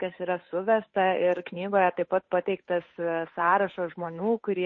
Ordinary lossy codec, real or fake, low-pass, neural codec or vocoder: MP3, 32 kbps; fake; 7.2 kHz; codec, 16 kHz, 8 kbps, FunCodec, trained on Chinese and English, 25 frames a second